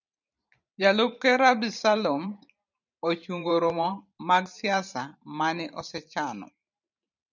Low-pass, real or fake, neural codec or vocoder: 7.2 kHz; fake; vocoder, 44.1 kHz, 128 mel bands every 512 samples, BigVGAN v2